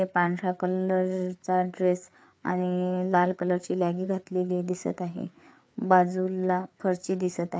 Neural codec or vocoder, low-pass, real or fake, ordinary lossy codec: codec, 16 kHz, 4 kbps, FreqCodec, larger model; none; fake; none